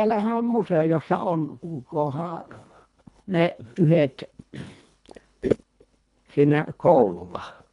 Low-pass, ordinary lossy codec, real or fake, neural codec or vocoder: 10.8 kHz; none; fake; codec, 24 kHz, 1.5 kbps, HILCodec